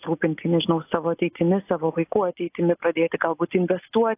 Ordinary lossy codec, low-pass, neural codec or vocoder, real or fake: AAC, 24 kbps; 3.6 kHz; none; real